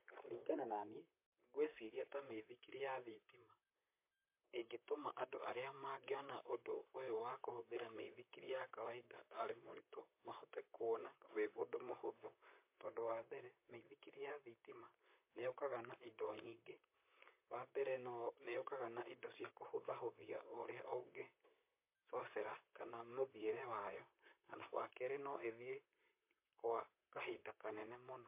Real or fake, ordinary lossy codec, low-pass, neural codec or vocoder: fake; MP3, 24 kbps; 3.6 kHz; vocoder, 44.1 kHz, 128 mel bands, Pupu-Vocoder